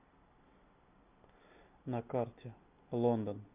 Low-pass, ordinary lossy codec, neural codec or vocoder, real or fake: 3.6 kHz; MP3, 32 kbps; none; real